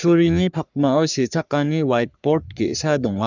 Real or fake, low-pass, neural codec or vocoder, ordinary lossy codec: fake; 7.2 kHz; codec, 44.1 kHz, 3.4 kbps, Pupu-Codec; none